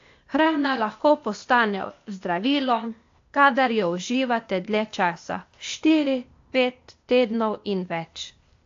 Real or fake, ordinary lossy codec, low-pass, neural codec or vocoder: fake; AAC, 48 kbps; 7.2 kHz; codec, 16 kHz, 0.8 kbps, ZipCodec